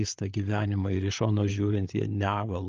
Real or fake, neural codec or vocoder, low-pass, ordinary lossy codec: fake; codec, 16 kHz, 8 kbps, FreqCodec, larger model; 7.2 kHz; Opus, 24 kbps